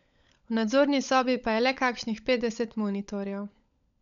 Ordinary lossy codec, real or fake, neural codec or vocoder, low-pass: none; fake; codec, 16 kHz, 16 kbps, FunCodec, trained on LibriTTS, 50 frames a second; 7.2 kHz